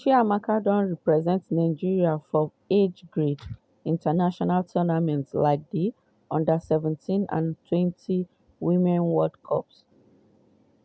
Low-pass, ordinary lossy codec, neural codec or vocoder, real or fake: none; none; none; real